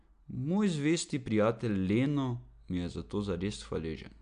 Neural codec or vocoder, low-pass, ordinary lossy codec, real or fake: none; 9.9 kHz; AAC, 96 kbps; real